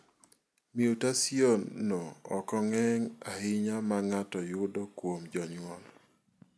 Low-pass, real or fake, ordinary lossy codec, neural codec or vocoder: none; real; none; none